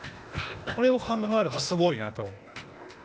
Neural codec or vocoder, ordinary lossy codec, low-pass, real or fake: codec, 16 kHz, 0.8 kbps, ZipCodec; none; none; fake